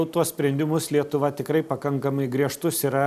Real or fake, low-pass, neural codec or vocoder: real; 14.4 kHz; none